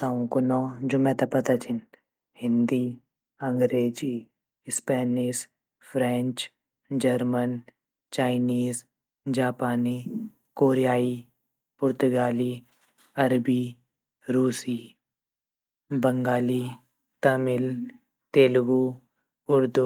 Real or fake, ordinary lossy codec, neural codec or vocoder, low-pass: real; Opus, 32 kbps; none; 19.8 kHz